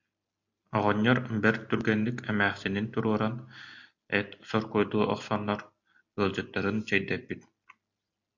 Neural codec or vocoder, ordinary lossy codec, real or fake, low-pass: none; MP3, 48 kbps; real; 7.2 kHz